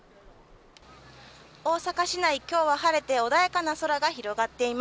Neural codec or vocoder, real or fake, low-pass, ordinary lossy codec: none; real; none; none